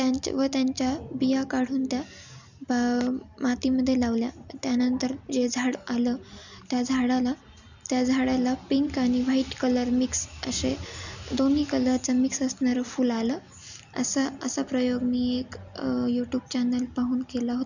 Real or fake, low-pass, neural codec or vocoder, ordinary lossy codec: real; 7.2 kHz; none; none